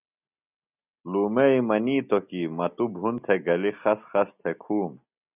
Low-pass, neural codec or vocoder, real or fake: 3.6 kHz; none; real